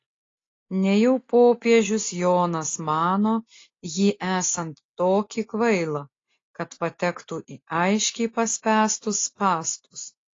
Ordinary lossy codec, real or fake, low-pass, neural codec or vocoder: AAC, 32 kbps; real; 7.2 kHz; none